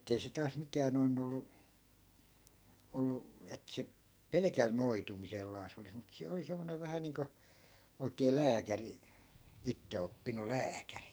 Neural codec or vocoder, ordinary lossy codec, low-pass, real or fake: codec, 44.1 kHz, 2.6 kbps, SNAC; none; none; fake